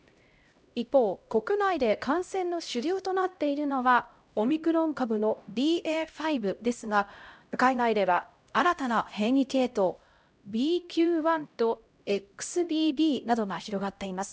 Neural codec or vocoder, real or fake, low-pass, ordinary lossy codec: codec, 16 kHz, 0.5 kbps, X-Codec, HuBERT features, trained on LibriSpeech; fake; none; none